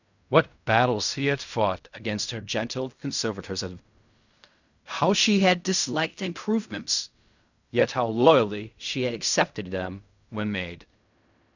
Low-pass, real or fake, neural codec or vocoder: 7.2 kHz; fake; codec, 16 kHz in and 24 kHz out, 0.4 kbps, LongCat-Audio-Codec, fine tuned four codebook decoder